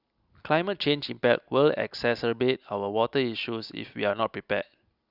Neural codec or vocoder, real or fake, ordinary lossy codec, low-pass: none; real; Opus, 64 kbps; 5.4 kHz